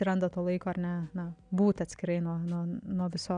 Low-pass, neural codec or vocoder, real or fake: 9.9 kHz; none; real